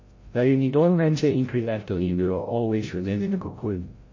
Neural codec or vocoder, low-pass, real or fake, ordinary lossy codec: codec, 16 kHz, 0.5 kbps, FreqCodec, larger model; 7.2 kHz; fake; MP3, 32 kbps